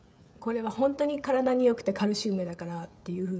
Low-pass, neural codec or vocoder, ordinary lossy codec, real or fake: none; codec, 16 kHz, 16 kbps, FreqCodec, smaller model; none; fake